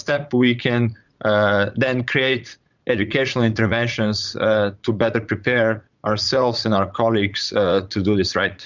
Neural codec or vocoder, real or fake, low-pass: vocoder, 44.1 kHz, 80 mel bands, Vocos; fake; 7.2 kHz